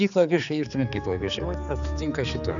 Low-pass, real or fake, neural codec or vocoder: 7.2 kHz; fake; codec, 16 kHz, 4 kbps, X-Codec, HuBERT features, trained on general audio